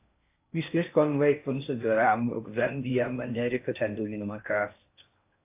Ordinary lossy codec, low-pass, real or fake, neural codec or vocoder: AAC, 24 kbps; 3.6 kHz; fake; codec, 16 kHz in and 24 kHz out, 0.6 kbps, FocalCodec, streaming, 4096 codes